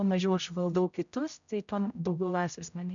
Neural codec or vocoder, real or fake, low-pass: codec, 16 kHz, 0.5 kbps, X-Codec, HuBERT features, trained on general audio; fake; 7.2 kHz